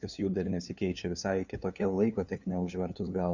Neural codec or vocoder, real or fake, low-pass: codec, 16 kHz, 2 kbps, FunCodec, trained on LibriTTS, 25 frames a second; fake; 7.2 kHz